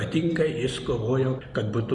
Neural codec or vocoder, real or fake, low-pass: none; real; 10.8 kHz